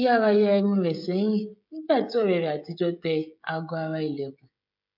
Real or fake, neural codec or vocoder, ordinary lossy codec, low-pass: fake; codec, 16 kHz, 16 kbps, FreqCodec, smaller model; MP3, 48 kbps; 5.4 kHz